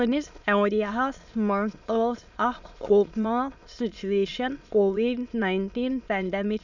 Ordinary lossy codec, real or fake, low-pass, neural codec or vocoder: none; fake; 7.2 kHz; autoencoder, 22.05 kHz, a latent of 192 numbers a frame, VITS, trained on many speakers